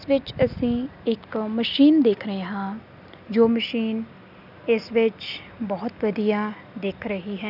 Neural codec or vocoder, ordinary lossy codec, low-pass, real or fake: none; none; 5.4 kHz; real